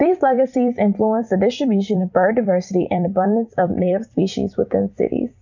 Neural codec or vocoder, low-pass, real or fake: vocoder, 44.1 kHz, 128 mel bands every 256 samples, BigVGAN v2; 7.2 kHz; fake